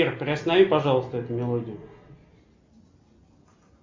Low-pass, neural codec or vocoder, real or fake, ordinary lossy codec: 7.2 kHz; none; real; MP3, 48 kbps